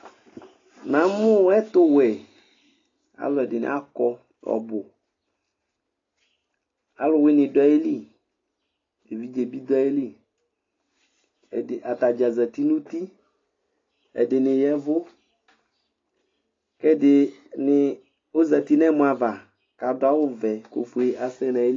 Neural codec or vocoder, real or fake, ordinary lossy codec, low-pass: none; real; AAC, 32 kbps; 7.2 kHz